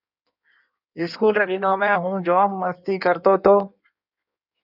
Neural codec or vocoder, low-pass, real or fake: codec, 16 kHz in and 24 kHz out, 1.1 kbps, FireRedTTS-2 codec; 5.4 kHz; fake